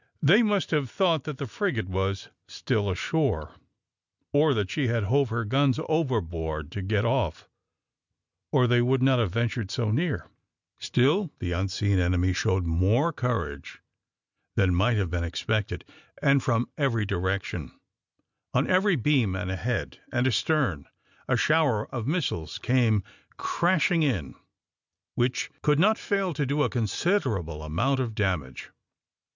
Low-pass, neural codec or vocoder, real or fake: 7.2 kHz; none; real